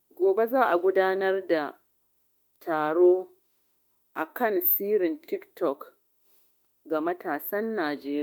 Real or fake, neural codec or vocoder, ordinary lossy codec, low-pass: fake; autoencoder, 48 kHz, 32 numbers a frame, DAC-VAE, trained on Japanese speech; MP3, 96 kbps; 19.8 kHz